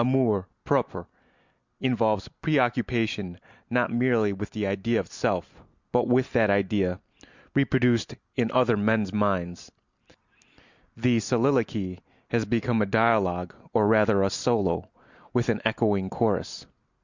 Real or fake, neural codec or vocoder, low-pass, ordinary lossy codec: real; none; 7.2 kHz; Opus, 64 kbps